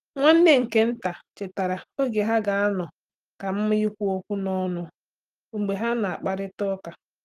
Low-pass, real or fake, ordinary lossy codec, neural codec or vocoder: 14.4 kHz; real; Opus, 24 kbps; none